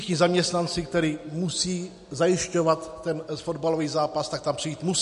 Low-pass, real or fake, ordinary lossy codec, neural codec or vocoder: 10.8 kHz; real; MP3, 48 kbps; none